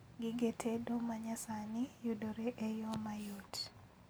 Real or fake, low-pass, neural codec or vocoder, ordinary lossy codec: real; none; none; none